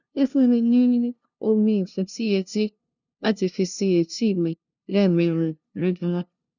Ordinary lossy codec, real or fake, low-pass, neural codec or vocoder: none; fake; 7.2 kHz; codec, 16 kHz, 0.5 kbps, FunCodec, trained on LibriTTS, 25 frames a second